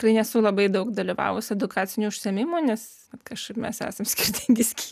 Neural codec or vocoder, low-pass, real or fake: none; 14.4 kHz; real